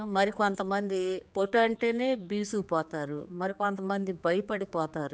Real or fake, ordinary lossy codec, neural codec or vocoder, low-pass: fake; none; codec, 16 kHz, 4 kbps, X-Codec, HuBERT features, trained on general audio; none